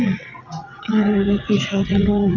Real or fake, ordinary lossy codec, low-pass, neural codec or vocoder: fake; none; 7.2 kHz; vocoder, 22.05 kHz, 80 mel bands, WaveNeXt